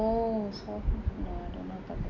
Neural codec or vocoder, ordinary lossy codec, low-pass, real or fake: none; none; 7.2 kHz; real